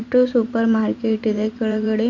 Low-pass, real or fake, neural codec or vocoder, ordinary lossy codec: 7.2 kHz; fake; vocoder, 44.1 kHz, 128 mel bands every 512 samples, BigVGAN v2; MP3, 64 kbps